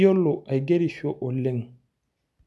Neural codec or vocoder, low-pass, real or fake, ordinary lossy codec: none; none; real; none